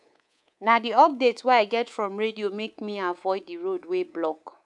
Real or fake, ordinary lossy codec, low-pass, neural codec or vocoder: fake; none; 10.8 kHz; codec, 24 kHz, 3.1 kbps, DualCodec